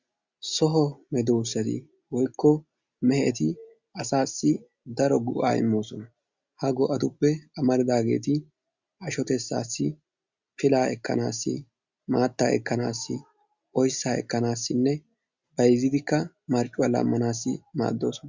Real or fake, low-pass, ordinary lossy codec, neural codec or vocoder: real; 7.2 kHz; Opus, 64 kbps; none